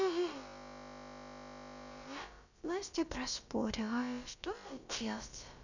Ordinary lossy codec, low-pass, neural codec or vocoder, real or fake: none; 7.2 kHz; codec, 16 kHz, about 1 kbps, DyCAST, with the encoder's durations; fake